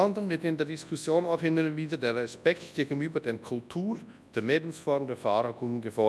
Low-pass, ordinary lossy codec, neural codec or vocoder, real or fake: none; none; codec, 24 kHz, 0.9 kbps, WavTokenizer, large speech release; fake